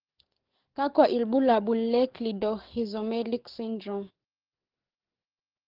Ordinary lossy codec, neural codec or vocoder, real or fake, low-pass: Opus, 16 kbps; codec, 44.1 kHz, 7.8 kbps, DAC; fake; 5.4 kHz